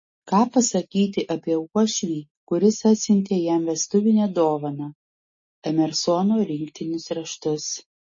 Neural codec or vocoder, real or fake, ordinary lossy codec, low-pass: none; real; MP3, 32 kbps; 7.2 kHz